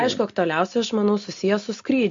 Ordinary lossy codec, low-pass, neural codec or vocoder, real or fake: MP3, 48 kbps; 7.2 kHz; none; real